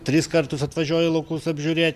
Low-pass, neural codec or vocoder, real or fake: 14.4 kHz; none; real